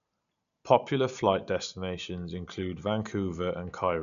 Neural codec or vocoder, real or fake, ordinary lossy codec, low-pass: none; real; none; 7.2 kHz